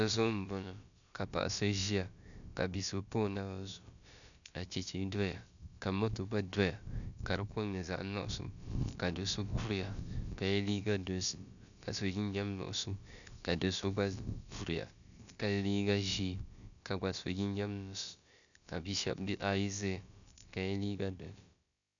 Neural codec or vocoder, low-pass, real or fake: codec, 16 kHz, about 1 kbps, DyCAST, with the encoder's durations; 7.2 kHz; fake